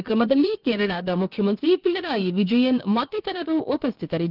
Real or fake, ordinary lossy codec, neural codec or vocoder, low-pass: fake; Opus, 32 kbps; codec, 16 kHz, about 1 kbps, DyCAST, with the encoder's durations; 5.4 kHz